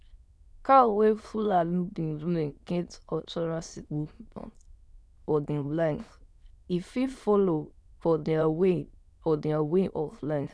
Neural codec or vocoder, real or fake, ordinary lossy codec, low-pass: autoencoder, 22.05 kHz, a latent of 192 numbers a frame, VITS, trained on many speakers; fake; none; none